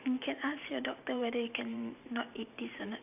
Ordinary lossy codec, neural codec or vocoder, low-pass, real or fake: none; vocoder, 44.1 kHz, 128 mel bands every 512 samples, BigVGAN v2; 3.6 kHz; fake